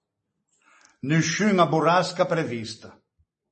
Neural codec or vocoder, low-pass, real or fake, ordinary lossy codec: none; 10.8 kHz; real; MP3, 32 kbps